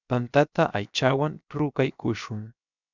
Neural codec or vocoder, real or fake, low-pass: codec, 16 kHz, 0.7 kbps, FocalCodec; fake; 7.2 kHz